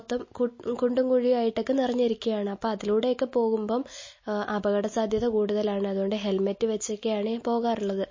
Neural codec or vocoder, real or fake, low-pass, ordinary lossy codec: none; real; 7.2 kHz; MP3, 32 kbps